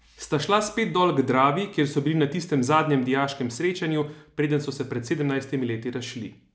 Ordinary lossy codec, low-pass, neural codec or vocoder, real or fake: none; none; none; real